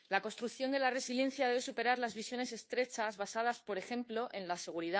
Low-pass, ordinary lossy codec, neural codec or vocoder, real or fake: none; none; codec, 16 kHz, 2 kbps, FunCodec, trained on Chinese and English, 25 frames a second; fake